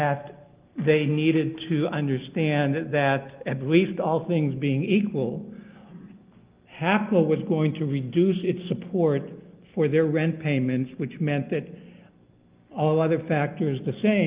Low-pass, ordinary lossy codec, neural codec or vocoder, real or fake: 3.6 kHz; Opus, 32 kbps; none; real